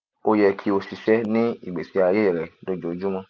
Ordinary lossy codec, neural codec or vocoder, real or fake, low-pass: none; none; real; none